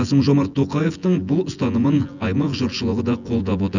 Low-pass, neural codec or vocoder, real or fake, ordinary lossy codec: 7.2 kHz; vocoder, 24 kHz, 100 mel bands, Vocos; fake; none